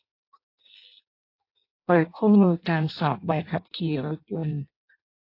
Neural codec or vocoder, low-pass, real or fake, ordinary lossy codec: codec, 16 kHz in and 24 kHz out, 0.6 kbps, FireRedTTS-2 codec; 5.4 kHz; fake; AAC, 32 kbps